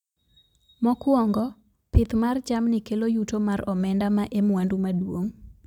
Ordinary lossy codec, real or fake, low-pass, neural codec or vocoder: none; real; 19.8 kHz; none